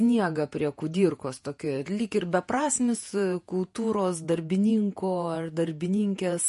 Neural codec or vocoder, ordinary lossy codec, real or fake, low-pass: vocoder, 48 kHz, 128 mel bands, Vocos; MP3, 48 kbps; fake; 14.4 kHz